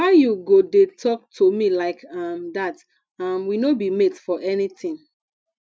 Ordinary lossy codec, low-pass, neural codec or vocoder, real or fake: none; none; none; real